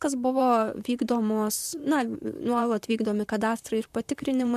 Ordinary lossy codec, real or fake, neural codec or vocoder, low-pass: MP3, 96 kbps; fake; vocoder, 44.1 kHz, 128 mel bands, Pupu-Vocoder; 14.4 kHz